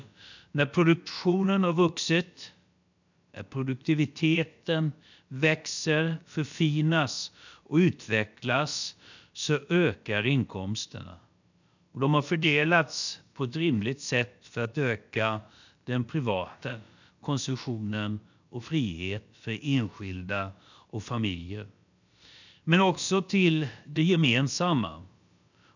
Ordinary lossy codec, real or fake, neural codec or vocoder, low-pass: none; fake; codec, 16 kHz, about 1 kbps, DyCAST, with the encoder's durations; 7.2 kHz